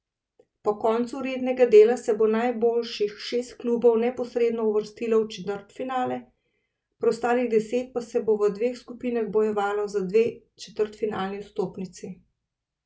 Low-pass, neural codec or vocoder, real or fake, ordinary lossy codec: none; none; real; none